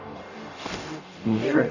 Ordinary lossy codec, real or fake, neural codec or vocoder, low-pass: none; fake; codec, 44.1 kHz, 0.9 kbps, DAC; 7.2 kHz